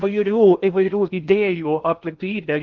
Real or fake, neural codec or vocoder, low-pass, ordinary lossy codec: fake; codec, 16 kHz in and 24 kHz out, 0.6 kbps, FocalCodec, streaming, 2048 codes; 7.2 kHz; Opus, 32 kbps